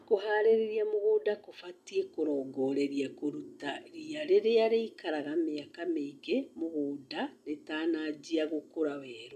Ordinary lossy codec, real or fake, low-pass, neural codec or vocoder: none; real; 14.4 kHz; none